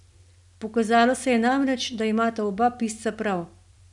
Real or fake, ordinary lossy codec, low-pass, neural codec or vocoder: real; none; 10.8 kHz; none